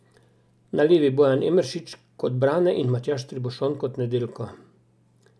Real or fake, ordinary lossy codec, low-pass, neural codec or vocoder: real; none; none; none